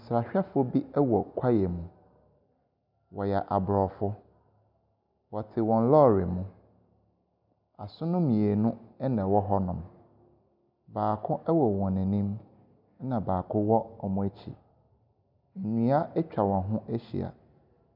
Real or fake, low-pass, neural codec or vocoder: real; 5.4 kHz; none